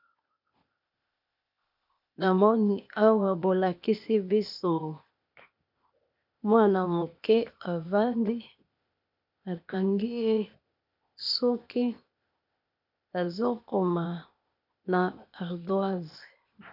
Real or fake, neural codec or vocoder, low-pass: fake; codec, 16 kHz, 0.8 kbps, ZipCodec; 5.4 kHz